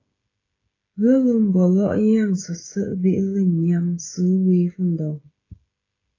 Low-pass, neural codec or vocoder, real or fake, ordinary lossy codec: 7.2 kHz; codec, 16 kHz, 16 kbps, FreqCodec, smaller model; fake; AAC, 32 kbps